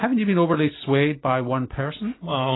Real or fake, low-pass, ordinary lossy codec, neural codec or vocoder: real; 7.2 kHz; AAC, 16 kbps; none